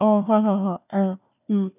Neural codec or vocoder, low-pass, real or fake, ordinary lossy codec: codec, 16 kHz, 1 kbps, FunCodec, trained on Chinese and English, 50 frames a second; 3.6 kHz; fake; none